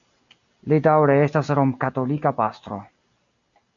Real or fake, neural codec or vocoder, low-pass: real; none; 7.2 kHz